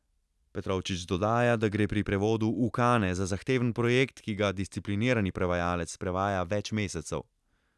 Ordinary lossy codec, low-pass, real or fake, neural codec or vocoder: none; none; real; none